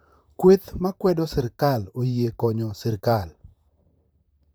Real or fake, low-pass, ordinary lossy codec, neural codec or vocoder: fake; none; none; vocoder, 44.1 kHz, 128 mel bands, Pupu-Vocoder